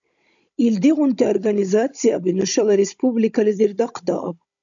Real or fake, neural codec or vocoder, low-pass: fake; codec, 16 kHz, 16 kbps, FunCodec, trained on Chinese and English, 50 frames a second; 7.2 kHz